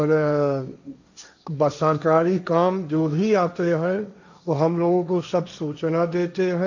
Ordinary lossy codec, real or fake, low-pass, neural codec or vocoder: none; fake; 7.2 kHz; codec, 16 kHz, 1.1 kbps, Voila-Tokenizer